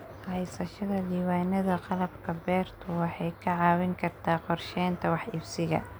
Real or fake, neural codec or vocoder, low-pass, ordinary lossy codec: real; none; none; none